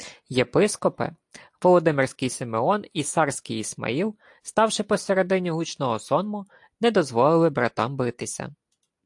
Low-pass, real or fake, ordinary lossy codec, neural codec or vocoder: 10.8 kHz; real; AAC, 64 kbps; none